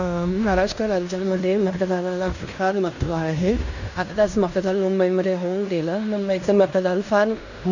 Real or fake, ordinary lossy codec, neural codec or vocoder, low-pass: fake; none; codec, 16 kHz in and 24 kHz out, 0.9 kbps, LongCat-Audio-Codec, fine tuned four codebook decoder; 7.2 kHz